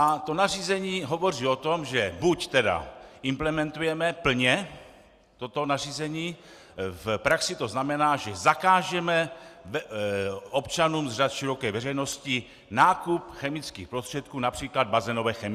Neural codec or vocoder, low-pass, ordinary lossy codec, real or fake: none; 14.4 kHz; Opus, 64 kbps; real